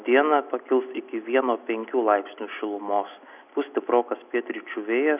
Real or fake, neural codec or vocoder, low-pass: real; none; 3.6 kHz